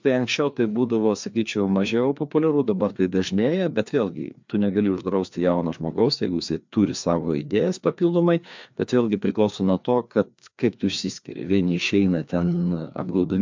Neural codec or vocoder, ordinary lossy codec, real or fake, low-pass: codec, 16 kHz, 2 kbps, FreqCodec, larger model; MP3, 64 kbps; fake; 7.2 kHz